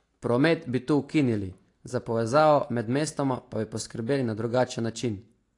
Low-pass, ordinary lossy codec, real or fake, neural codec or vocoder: 10.8 kHz; AAC, 48 kbps; real; none